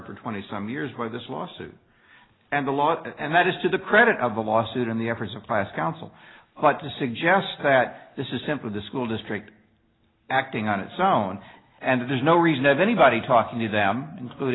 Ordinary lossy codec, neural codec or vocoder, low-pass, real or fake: AAC, 16 kbps; none; 7.2 kHz; real